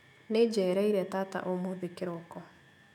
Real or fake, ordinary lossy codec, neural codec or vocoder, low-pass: fake; none; autoencoder, 48 kHz, 128 numbers a frame, DAC-VAE, trained on Japanese speech; 19.8 kHz